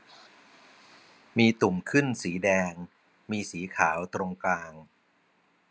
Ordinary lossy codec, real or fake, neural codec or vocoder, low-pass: none; real; none; none